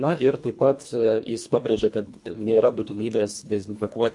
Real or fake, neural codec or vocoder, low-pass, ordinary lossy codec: fake; codec, 24 kHz, 1.5 kbps, HILCodec; 10.8 kHz; MP3, 64 kbps